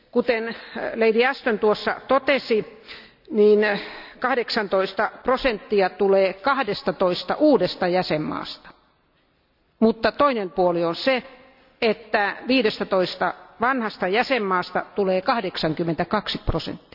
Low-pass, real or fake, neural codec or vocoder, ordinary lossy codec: 5.4 kHz; real; none; none